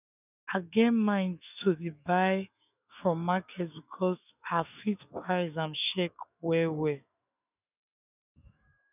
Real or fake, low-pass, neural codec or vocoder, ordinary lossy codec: fake; 3.6 kHz; autoencoder, 48 kHz, 128 numbers a frame, DAC-VAE, trained on Japanese speech; none